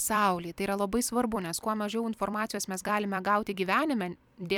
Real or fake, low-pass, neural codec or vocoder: fake; 19.8 kHz; vocoder, 44.1 kHz, 128 mel bands every 256 samples, BigVGAN v2